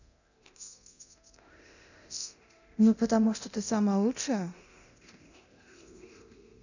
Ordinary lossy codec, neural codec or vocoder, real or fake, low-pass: none; codec, 24 kHz, 0.9 kbps, DualCodec; fake; 7.2 kHz